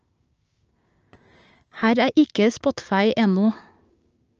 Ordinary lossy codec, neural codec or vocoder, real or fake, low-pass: Opus, 24 kbps; codec, 16 kHz, 6 kbps, DAC; fake; 7.2 kHz